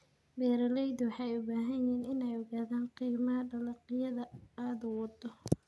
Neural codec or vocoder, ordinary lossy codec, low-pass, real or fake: none; none; none; real